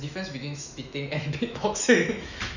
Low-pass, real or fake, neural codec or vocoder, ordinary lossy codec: 7.2 kHz; fake; autoencoder, 48 kHz, 128 numbers a frame, DAC-VAE, trained on Japanese speech; none